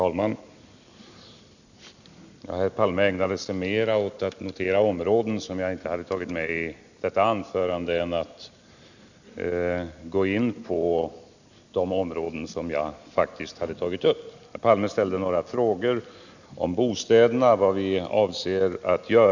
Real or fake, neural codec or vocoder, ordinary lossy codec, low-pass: real; none; none; 7.2 kHz